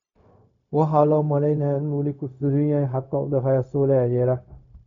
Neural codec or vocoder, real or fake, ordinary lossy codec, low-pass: codec, 16 kHz, 0.4 kbps, LongCat-Audio-Codec; fake; none; 7.2 kHz